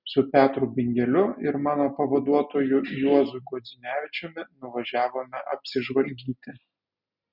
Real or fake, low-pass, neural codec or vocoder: real; 5.4 kHz; none